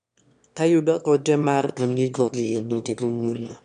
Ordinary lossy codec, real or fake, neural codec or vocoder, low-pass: none; fake; autoencoder, 22.05 kHz, a latent of 192 numbers a frame, VITS, trained on one speaker; 9.9 kHz